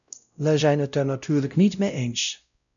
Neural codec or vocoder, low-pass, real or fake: codec, 16 kHz, 0.5 kbps, X-Codec, WavLM features, trained on Multilingual LibriSpeech; 7.2 kHz; fake